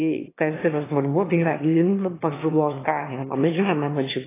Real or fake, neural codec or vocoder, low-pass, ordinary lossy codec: fake; autoencoder, 22.05 kHz, a latent of 192 numbers a frame, VITS, trained on one speaker; 3.6 kHz; AAC, 16 kbps